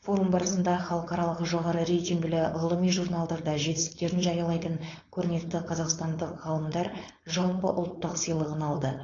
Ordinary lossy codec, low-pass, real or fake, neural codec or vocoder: AAC, 32 kbps; 7.2 kHz; fake; codec, 16 kHz, 4.8 kbps, FACodec